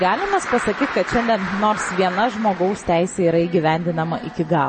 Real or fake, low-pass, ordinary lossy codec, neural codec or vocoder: real; 9.9 kHz; MP3, 32 kbps; none